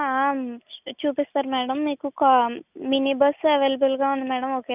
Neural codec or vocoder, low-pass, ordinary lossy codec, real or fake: none; 3.6 kHz; none; real